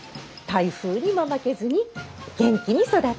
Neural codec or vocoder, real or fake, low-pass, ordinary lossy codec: none; real; none; none